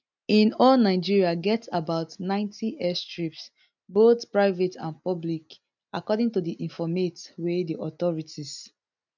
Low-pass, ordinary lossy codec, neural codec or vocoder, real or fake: 7.2 kHz; none; none; real